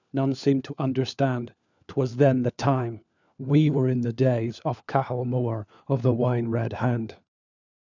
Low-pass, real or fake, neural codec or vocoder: 7.2 kHz; fake; codec, 16 kHz, 4 kbps, FunCodec, trained on LibriTTS, 50 frames a second